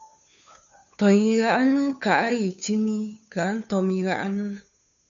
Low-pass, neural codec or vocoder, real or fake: 7.2 kHz; codec, 16 kHz, 2 kbps, FunCodec, trained on Chinese and English, 25 frames a second; fake